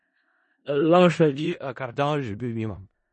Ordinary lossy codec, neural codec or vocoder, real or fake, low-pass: MP3, 32 kbps; codec, 16 kHz in and 24 kHz out, 0.4 kbps, LongCat-Audio-Codec, four codebook decoder; fake; 10.8 kHz